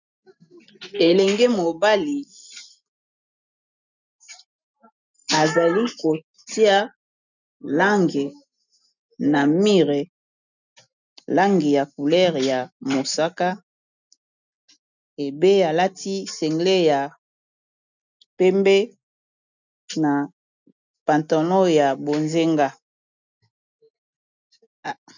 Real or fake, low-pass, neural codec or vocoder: real; 7.2 kHz; none